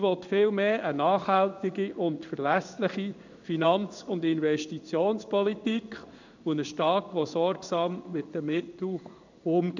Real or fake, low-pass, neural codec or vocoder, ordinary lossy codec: fake; 7.2 kHz; codec, 16 kHz in and 24 kHz out, 1 kbps, XY-Tokenizer; none